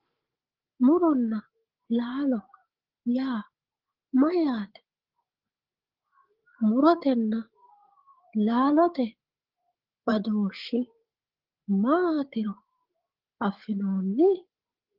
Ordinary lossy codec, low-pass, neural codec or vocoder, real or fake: Opus, 16 kbps; 5.4 kHz; codec, 16 kHz, 8 kbps, FreqCodec, larger model; fake